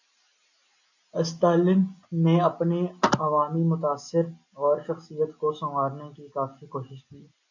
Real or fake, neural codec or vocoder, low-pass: real; none; 7.2 kHz